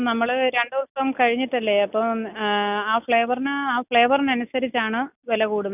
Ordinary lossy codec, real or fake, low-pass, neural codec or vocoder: none; real; 3.6 kHz; none